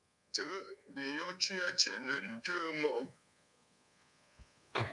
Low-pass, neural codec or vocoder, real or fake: 10.8 kHz; codec, 24 kHz, 1.2 kbps, DualCodec; fake